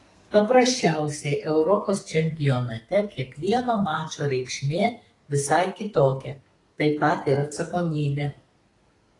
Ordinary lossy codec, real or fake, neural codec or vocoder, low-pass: AAC, 32 kbps; fake; codec, 32 kHz, 1.9 kbps, SNAC; 10.8 kHz